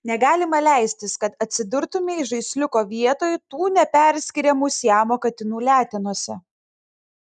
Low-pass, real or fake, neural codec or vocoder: 10.8 kHz; real; none